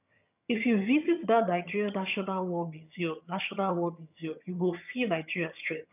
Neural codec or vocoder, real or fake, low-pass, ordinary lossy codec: vocoder, 22.05 kHz, 80 mel bands, HiFi-GAN; fake; 3.6 kHz; none